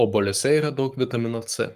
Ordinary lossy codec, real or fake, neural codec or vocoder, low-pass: Opus, 32 kbps; fake; codec, 44.1 kHz, 7.8 kbps, Pupu-Codec; 14.4 kHz